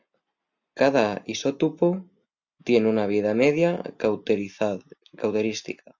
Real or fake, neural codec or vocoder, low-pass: real; none; 7.2 kHz